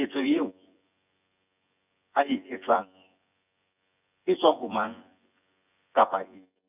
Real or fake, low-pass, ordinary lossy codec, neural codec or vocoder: fake; 3.6 kHz; none; vocoder, 24 kHz, 100 mel bands, Vocos